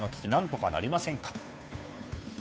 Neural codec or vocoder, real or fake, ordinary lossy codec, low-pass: codec, 16 kHz, 2 kbps, FunCodec, trained on Chinese and English, 25 frames a second; fake; none; none